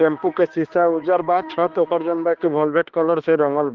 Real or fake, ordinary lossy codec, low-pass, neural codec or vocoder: fake; Opus, 16 kbps; 7.2 kHz; codec, 16 kHz, 2 kbps, X-Codec, HuBERT features, trained on balanced general audio